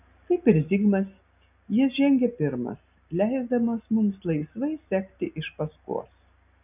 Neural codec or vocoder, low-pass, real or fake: none; 3.6 kHz; real